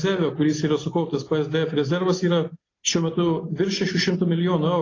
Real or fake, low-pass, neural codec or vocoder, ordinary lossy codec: real; 7.2 kHz; none; AAC, 32 kbps